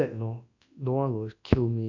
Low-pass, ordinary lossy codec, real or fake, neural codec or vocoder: 7.2 kHz; AAC, 48 kbps; fake; codec, 24 kHz, 0.9 kbps, WavTokenizer, large speech release